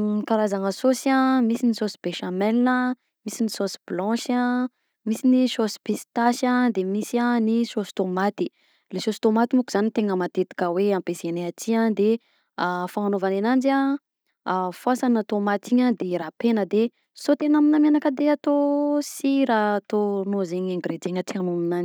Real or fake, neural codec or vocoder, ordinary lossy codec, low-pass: real; none; none; none